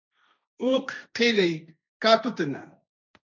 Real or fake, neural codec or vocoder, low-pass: fake; codec, 16 kHz, 1.1 kbps, Voila-Tokenizer; 7.2 kHz